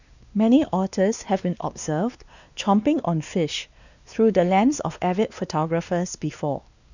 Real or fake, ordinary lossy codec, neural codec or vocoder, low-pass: fake; none; codec, 16 kHz, 2 kbps, X-Codec, WavLM features, trained on Multilingual LibriSpeech; 7.2 kHz